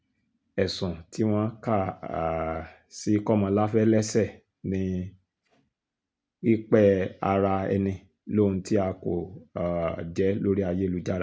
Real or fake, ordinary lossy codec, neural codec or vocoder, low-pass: real; none; none; none